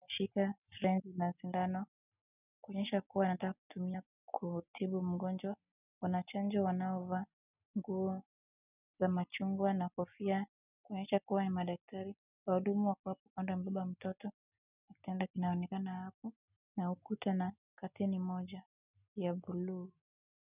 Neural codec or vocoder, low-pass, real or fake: none; 3.6 kHz; real